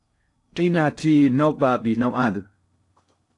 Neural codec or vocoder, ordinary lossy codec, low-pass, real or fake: codec, 16 kHz in and 24 kHz out, 0.6 kbps, FocalCodec, streaming, 2048 codes; AAC, 48 kbps; 10.8 kHz; fake